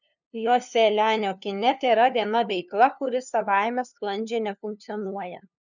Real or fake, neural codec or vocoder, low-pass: fake; codec, 16 kHz, 2 kbps, FunCodec, trained on LibriTTS, 25 frames a second; 7.2 kHz